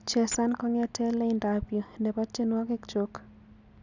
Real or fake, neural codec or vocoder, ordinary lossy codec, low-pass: real; none; none; 7.2 kHz